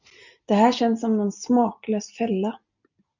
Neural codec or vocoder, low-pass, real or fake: none; 7.2 kHz; real